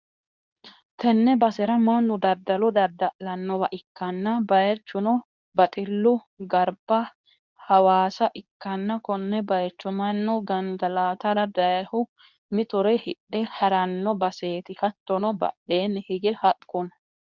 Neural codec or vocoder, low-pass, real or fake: codec, 24 kHz, 0.9 kbps, WavTokenizer, medium speech release version 2; 7.2 kHz; fake